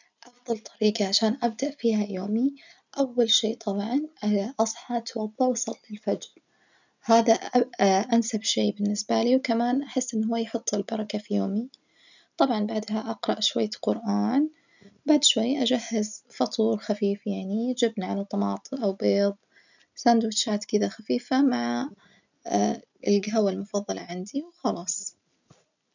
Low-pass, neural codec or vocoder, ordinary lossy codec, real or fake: 7.2 kHz; none; none; real